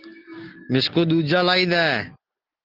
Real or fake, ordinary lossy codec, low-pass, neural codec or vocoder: real; Opus, 16 kbps; 5.4 kHz; none